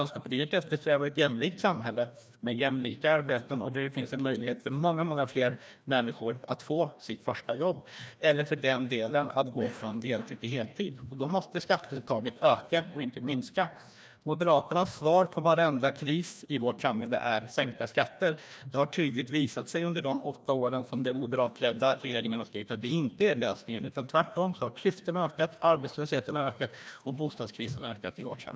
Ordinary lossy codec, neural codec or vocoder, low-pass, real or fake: none; codec, 16 kHz, 1 kbps, FreqCodec, larger model; none; fake